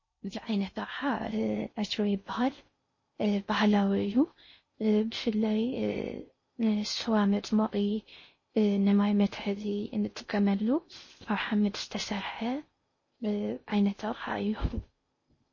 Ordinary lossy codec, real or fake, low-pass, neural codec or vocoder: MP3, 32 kbps; fake; 7.2 kHz; codec, 16 kHz in and 24 kHz out, 0.6 kbps, FocalCodec, streaming, 2048 codes